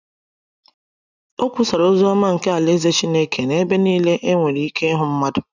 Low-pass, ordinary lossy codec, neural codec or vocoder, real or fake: 7.2 kHz; none; none; real